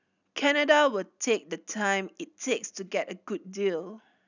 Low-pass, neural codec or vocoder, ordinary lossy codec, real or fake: 7.2 kHz; none; none; real